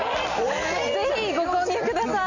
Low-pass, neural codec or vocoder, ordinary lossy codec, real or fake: 7.2 kHz; none; none; real